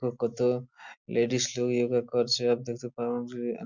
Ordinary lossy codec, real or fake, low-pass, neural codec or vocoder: Opus, 64 kbps; real; 7.2 kHz; none